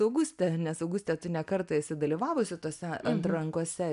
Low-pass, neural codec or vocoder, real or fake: 10.8 kHz; none; real